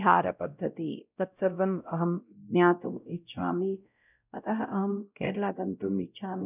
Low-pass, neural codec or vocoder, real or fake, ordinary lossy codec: 3.6 kHz; codec, 16 kHz, 0.5 kbps, X-Codec, WavLM features, trained on Multilingual LibriSpeech; fake; none